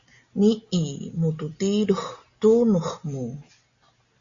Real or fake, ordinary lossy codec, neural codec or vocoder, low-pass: real; Opus, 64 kbps; none; 7.2 kHz